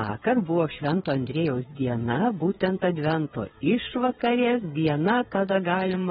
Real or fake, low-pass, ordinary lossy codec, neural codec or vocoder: fake; 7.2 kHz; AAC, 16 kbps; codec, 16 kHz, 8 kbps, FreqCodec, smaller model